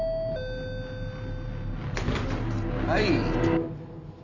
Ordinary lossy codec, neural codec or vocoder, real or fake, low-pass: none; none; real; 7.2 kHz